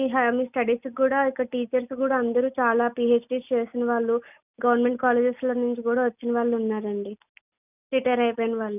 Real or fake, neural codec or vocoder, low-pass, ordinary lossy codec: real; none; 3.6 kHz; none